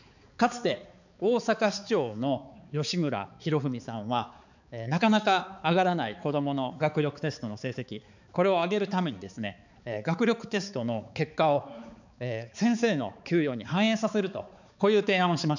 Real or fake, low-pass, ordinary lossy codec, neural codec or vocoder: fake; 7.2 kHz; none; codec, 16 kHz, 4 kbps, X-Codec, HuBERT features, trained on balanced general audio